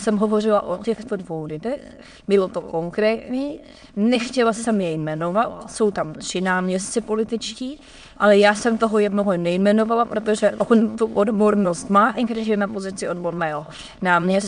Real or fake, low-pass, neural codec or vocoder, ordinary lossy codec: fake; 9.9 kHz; autoencoder, 22.05 kHz, a latent of 192 numbers a frame, VITS, trained on many speakers; MP3, 64 kbps